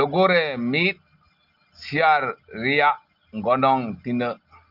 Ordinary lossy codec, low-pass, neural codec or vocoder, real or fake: Opus, 32 kbps; 5.4 kHz; none; real